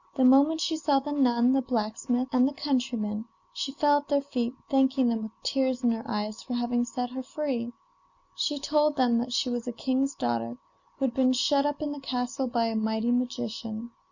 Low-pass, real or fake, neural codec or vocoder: 7.2 kHz; real; none